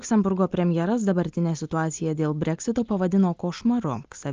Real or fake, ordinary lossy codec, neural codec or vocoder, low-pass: real; Opus, 24 kbps; none; 7.2 kHz